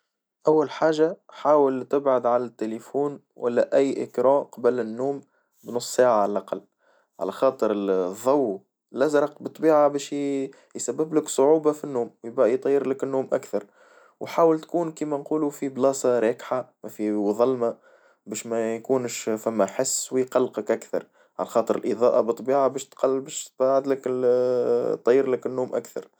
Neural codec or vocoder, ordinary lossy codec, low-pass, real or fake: none; none; none; real